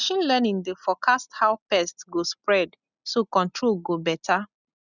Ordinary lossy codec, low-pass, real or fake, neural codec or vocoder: none; 7.2 kHz; real; none